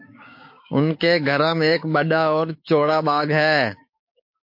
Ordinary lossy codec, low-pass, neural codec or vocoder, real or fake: MP3, 32 kbps; 5.4 kHz; codec, 16 kHz, 6 kbps, DAC; fake